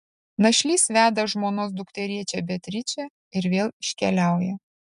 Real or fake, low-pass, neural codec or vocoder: real; 10.8 kHz; none